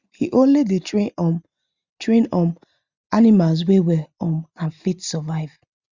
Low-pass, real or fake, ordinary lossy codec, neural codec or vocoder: 7.2 kHz; real; Opus, 64 kbps; none